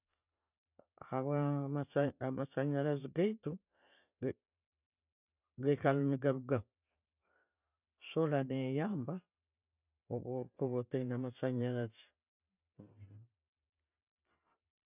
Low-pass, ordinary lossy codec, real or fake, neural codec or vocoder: 3.6 kHz; none; fake; vocoder, 44.1 kHz, 128 mel bands every 512 samples, BigVGAN v2